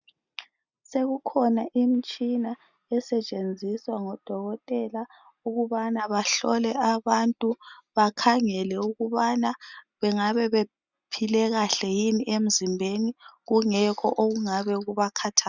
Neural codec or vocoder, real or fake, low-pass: none; real; 7.2 kHz